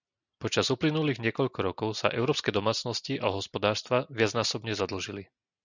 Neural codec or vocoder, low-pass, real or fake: none; 7.2 kHz; real